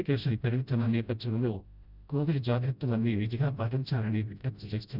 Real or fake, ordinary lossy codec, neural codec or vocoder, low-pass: fake; none; codec, 16 kHz, 0.5 kbps, FreqCodec, smaller model; 5.4 kHz